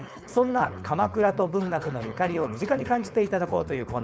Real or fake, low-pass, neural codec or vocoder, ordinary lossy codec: fake; none; codec, 16 kHz, 4.8 kbps, FACodec; none